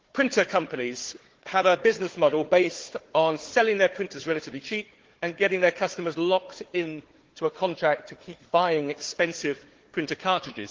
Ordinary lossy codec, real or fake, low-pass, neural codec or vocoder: Opus, 16 kbps; fake; 7.2 kHz; codec, 16 kHz, 4 kbps, FunCodec, trained on Chinese and English, 50 frames a second